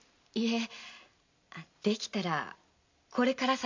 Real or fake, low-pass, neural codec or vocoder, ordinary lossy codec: real; 7.2 kHz; none; MP3, 64 kbps